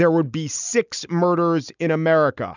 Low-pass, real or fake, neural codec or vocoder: 7.2 kHz; real; none